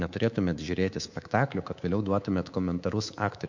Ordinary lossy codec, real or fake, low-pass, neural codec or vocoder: MP3, 48 kbps; fake; 7.2 kHz; codec, 24 kHz, 3.1 kbps, DualCodec